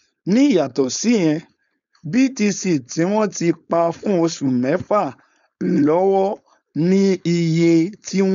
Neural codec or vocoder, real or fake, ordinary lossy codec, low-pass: codec, 16 kHz, 4.8 kbps, FACodec; fake; none; 7.2 kHz